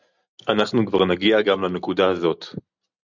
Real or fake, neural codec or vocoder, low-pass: real; none; 7.2 kHz